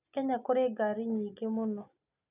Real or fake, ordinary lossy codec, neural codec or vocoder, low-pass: real; none; none; 3.6 kHz